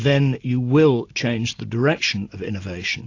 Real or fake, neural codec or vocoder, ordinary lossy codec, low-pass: real; none; AAC, 48 kbps; 7.2 kHz